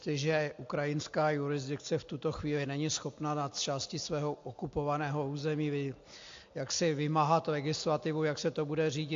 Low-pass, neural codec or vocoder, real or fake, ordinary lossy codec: 7.2 kHz; none; real; AAC, 48 kbps